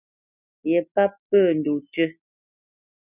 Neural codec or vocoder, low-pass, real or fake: none; 3.6 kHz; real